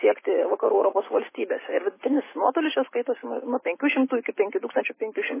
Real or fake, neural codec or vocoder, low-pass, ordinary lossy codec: real; none; 3.6 kHz; MP3, 16 kbps